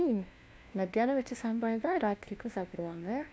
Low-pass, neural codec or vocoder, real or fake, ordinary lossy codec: none; codec, 16 kHz, 1 kbps, FunCodec, trained on LibriTTS, 50 frames a second; fake; none